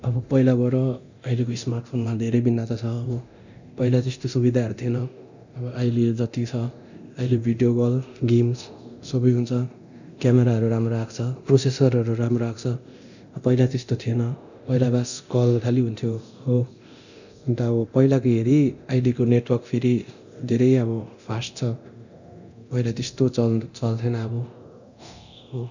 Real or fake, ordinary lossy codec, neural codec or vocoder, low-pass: fake; none; codec, 24 kHz, 0.9 kbps, DualCodec; 7.2 kHz